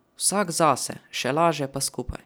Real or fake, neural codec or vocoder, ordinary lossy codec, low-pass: real; none; none; none